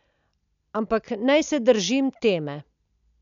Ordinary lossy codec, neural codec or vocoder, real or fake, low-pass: none; none; real; 7.2 kHz